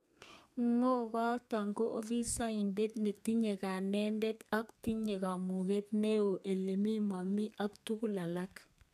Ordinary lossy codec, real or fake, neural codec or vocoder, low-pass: none; fake; codec, 32 kHz, 1.9 kbps, SNAC; 14.4 kHz